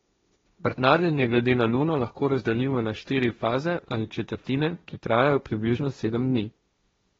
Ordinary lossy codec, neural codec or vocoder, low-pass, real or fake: AAC, 24 kbps; codec, 16 kHz, 1.1 kbps, Voila-Tokenizer; 7.2 kHz; fake